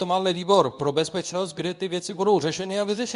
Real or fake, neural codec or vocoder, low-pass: fake; codec, 24 kHz, 0.9 kbps, WavTokenizer, medium speech release version 2; 10.8 kHz